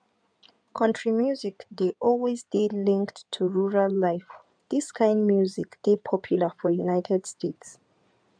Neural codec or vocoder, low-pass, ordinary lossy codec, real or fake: codec, 16 kHz in and 24 kHz out, 2.2 kbps, FireRedTTS-2 codec; 9.9 kHz; none; fake